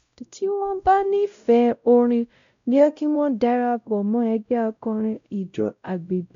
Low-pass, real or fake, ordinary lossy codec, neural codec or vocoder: 7.2 kHz; fake; MP3, 64 kbps; codec, 16 kHz, 0.5 kbps, X-Codec, WavLM features, trained on Multilingual LibriSpeech